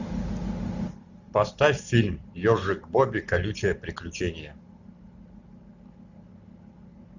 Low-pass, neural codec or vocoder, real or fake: 7.2 kHz; none; real